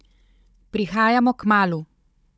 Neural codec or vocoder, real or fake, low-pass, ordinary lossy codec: codec, 16 kHz, 16 kbps, FunCodec, trained on Chinese and English, 50 frames a second; fake; none; none